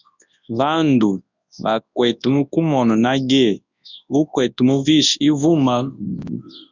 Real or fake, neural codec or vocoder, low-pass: fake; codec, 24 kHz, 0.9 kbps, WavTokenizer, large speech release; 7.2 kHz